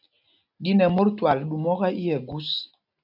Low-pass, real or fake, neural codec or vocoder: 5.4 kHz; real; none